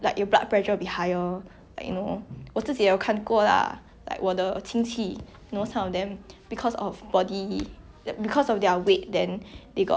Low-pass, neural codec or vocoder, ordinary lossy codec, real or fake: none; none; none; real